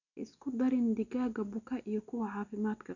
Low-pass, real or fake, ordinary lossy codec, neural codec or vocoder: 7.2 kHz; real; none; none